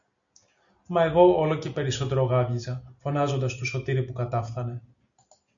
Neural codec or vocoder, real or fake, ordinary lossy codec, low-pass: none; real; MP3, 64 kbps; 7.2 kHz